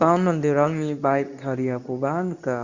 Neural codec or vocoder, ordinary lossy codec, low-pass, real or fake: codec, 24 kHz, 0.9 kbps, WavTokenizer, medium speech release version 2; Opus, 64 kbps; 7.2 kHz; fake